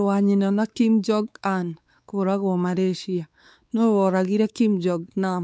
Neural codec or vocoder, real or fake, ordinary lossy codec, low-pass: codec, 16 kHz, 4 kbps, X-Codec, WavLM features, trained on Multilingual LibriSpeech; fake; none; none